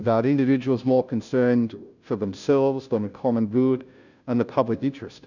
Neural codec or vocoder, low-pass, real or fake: codec, 16 kHz, 0.5 kbps, FunCodec, trained on Chinese and English, 25 frames a second; 7.2 kHz; fake